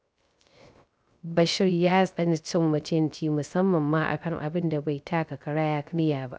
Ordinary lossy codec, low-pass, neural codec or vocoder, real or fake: none; none; codec, 16 kHz, 0.3 kbps, FocalCodec; fake